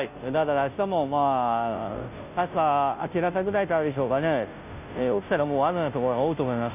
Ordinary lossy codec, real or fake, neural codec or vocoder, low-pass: none; fake; codec, 16 kHz, 0.5 kbps, FunCodec, trained on Chinese and English, 25 frames a second; 3.6 kHz